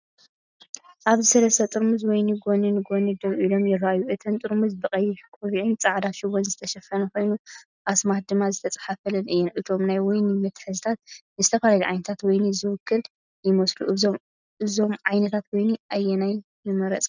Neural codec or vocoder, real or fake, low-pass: none; real; 7.2 kHz